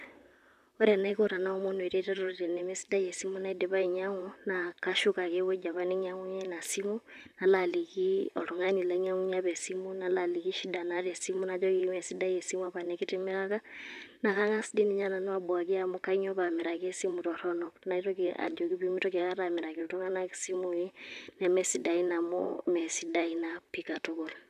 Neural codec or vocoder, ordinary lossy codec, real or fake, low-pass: vocoder, 44.1 kHz, 128 mel bands, Pupu-Vocoder; none; fake; 14.4 kHz